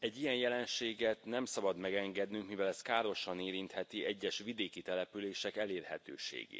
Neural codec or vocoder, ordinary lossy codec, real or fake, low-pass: none; none; real; none